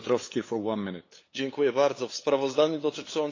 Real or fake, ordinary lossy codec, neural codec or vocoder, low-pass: fake; AAC, 32 kbps; codec, 16 kHz, 8 kbps, FunCodec, trained on LibriTTS, 25 frames a second; 7.2 kHz